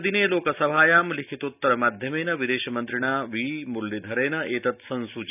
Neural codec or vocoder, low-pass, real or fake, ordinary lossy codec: none; 3.6 kHz; real; none